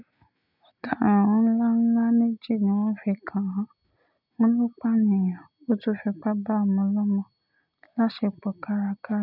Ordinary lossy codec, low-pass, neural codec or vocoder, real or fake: none; 5.4 kHz; none; real